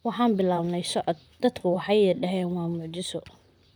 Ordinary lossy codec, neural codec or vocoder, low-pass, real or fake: none; vocoder, 44.1 kHz, 128 mel bands, Pupu-Vocoder; none; fake